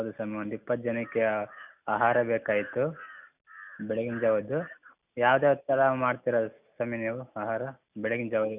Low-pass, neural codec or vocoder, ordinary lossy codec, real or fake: 3.6 kHz; none; none; real